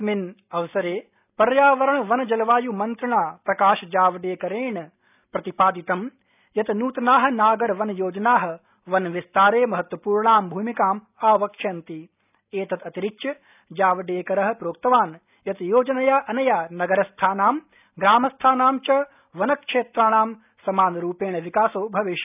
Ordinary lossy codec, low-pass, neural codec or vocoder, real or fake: none; 3.6 kHz; none; real